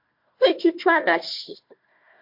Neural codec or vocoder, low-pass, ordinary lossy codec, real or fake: codec, 16 kHz, 1 kbps, FunCodec, trained on Chinese and English, 50 frames a second; 5.4 kHz; MP3, 32 kbps; fake